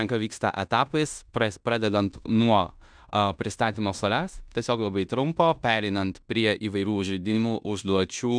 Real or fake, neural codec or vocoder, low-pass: fake; codec, 16 kHz in and 24 kHz out, 0.9 kbps, LongCat-Audio-Codec, fine tuned four codebook decoder; 9.9 kHz